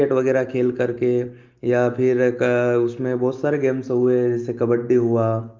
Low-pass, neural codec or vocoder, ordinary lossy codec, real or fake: 7.2 kHz; none; Opus, 24 kbps; real